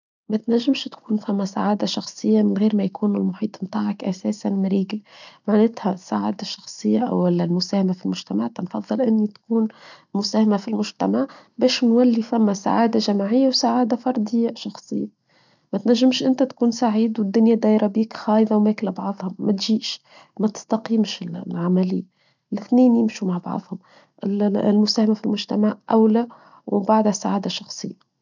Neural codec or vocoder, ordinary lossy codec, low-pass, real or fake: none; none; 7.2 kHz; real